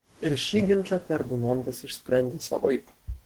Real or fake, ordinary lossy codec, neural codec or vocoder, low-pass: fake; Opus, 16 kbps; codec, 44.1 kHz, 2.6 kbps, DAC; 19.8 kHz